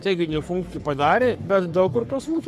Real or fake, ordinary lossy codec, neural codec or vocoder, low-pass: fake; MP3, 96 kbps; codec, 44.1 kHz, 3.4 kbps, Pupu-Codec; 14.4 kHz